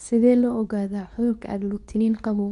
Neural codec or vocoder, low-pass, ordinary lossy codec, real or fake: codec, 24 kHz, 0.9 kbps, WavTokenizer, medium speech release version 2; 10.8 kHz; none; fake